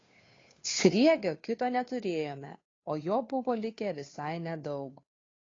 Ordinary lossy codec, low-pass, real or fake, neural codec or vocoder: AAC, 32 kbps; 7.2 kHz; fake; codec, 16 kHz, 2 kbps, FunCodec, trained on Chinese and English, 25 frames a second